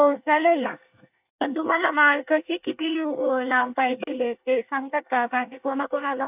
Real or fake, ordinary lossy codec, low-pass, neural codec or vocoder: fake; AAC, 32 kbps; 3.6 kHz; codec, 24 kHz, 1 kbps, SNAC